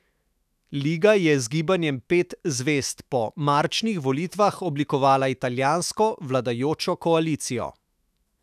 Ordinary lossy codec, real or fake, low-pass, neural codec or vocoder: none; fake; 14.4 kHz; autoencoder, 48 kHz, 128 numbers a frame, DAC-VAE, trained on Japanese speech